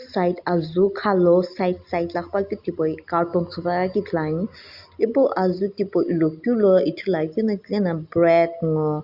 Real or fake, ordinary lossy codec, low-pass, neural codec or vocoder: real; Opus, 64 kbps; 5.4 kHz; none